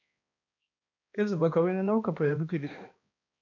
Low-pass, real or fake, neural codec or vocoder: 7.2 kHz; fake; codec, 16 kHz, 1 kbps, X-Codec, HuBERT features, trained on balanced general audio